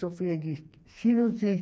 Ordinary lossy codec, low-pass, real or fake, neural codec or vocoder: none; none; fake; codec, 16 kHz, 2 kbps, FreqCodec, smaller model